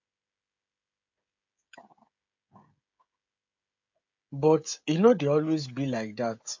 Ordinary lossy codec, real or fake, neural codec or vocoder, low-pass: MP3, 48 kbps; fake; codec, 16 kHz, 16 kbps, FreqCodec, smaller model; 7.2 kHz